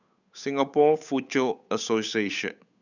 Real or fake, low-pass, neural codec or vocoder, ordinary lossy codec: fake; 7.2 kHz; codec, 16 kHz, 8 kbps, FunCodec, trained on Chinese and English, 25 frames a second; none